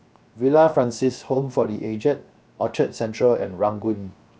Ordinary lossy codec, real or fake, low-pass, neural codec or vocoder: none; fake; none; codec, 16 kHz, 0.7 kbps, FocalCodec